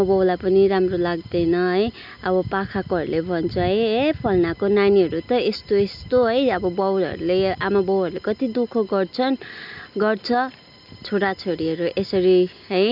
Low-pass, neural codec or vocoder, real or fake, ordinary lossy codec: 5.4 kHz; none; real; none